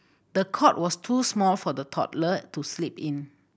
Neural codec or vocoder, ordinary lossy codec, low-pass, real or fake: none; none; none; real